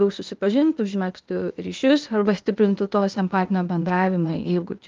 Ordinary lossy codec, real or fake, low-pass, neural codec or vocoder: Opus, 32 kbps; fake; 7.2 kHz; codec, 16 kHz, 0.8 kbps, ZipCodec